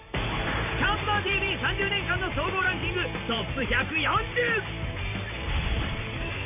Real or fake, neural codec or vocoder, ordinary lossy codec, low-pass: fake; vocoder, 44.1 kHz, 128 mel bands every 512 samples, BigVGAN v2; none; 3.6 kHz